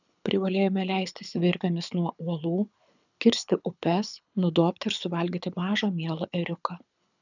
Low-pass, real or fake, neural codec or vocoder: 7.2 kHz; fake; codec, 24 kHz, 6 kbps, HILCodec